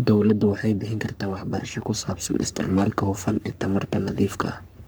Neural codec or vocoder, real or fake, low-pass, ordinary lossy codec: codec, 44.1 kHz, 3.4 kbps, Pupu-Codec; fake; none; none